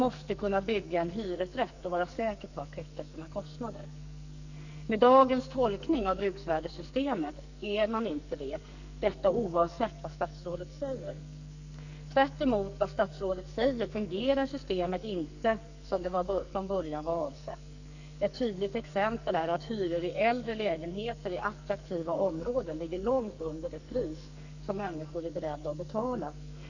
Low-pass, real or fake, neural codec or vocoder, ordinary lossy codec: 7.2 kHz; fake; codec, 44.1 kHz, 2.6 kbps, SNAC; none